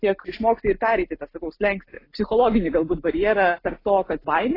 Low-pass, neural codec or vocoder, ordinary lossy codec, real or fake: 5.4 kHz; none; AAC, 24 kbps; real